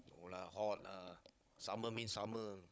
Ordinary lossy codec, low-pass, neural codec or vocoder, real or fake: none; none; codec, 16 kHz, 16 kbps, FunCodec, trained on Chinese and English, 50 frames a second; fake